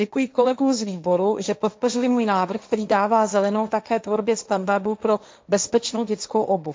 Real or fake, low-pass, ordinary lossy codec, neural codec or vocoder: fake; none; none; codec, 16 kHz, 1.1 kbps, Voila-Tokenizer